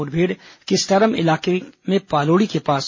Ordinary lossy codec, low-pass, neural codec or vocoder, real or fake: MP3, 32 kbps; 7.2 kHz; none; real